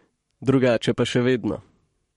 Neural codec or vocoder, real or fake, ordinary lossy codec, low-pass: codec, 44.1 kHz, 7.8 kbps, Pupu-Codec; fake; MP3, 48 kbps; 19.8 kHz